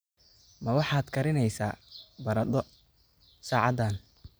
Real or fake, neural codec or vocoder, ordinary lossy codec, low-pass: real; none; none; none